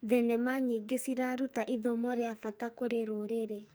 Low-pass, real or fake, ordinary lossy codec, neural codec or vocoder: none; fake; none; codec, 44.1 kHz, 2.6 kbps, SNAC